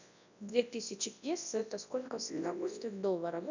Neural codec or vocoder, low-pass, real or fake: codec, 24 kHz, 0.9 kbps, WavTokenizer, large speech release; 7.2 kHz; fake